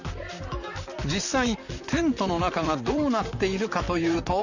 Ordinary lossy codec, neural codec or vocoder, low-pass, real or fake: none; vocoder, 44.1 kHz, 128 mel bands, Pupu-Vocoder; 7.2 kHz; fake